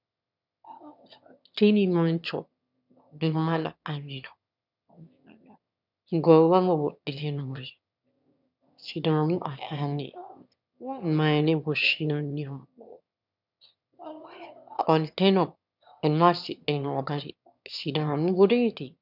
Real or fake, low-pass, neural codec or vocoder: fake; 5.4 kHz; autoencoder, 22.05 kHz, a latent of 192 numbers a frame, VITS, trained on one speaker